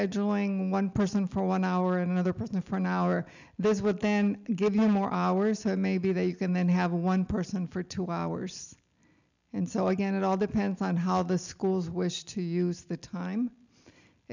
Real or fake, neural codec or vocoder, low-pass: real; none; 7.2 kHz